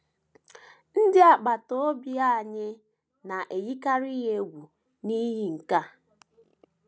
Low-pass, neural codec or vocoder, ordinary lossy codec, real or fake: none; none; none; real